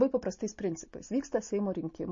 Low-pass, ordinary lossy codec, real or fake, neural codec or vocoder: 7.2 kHz; MP3, 32 kbps; real; none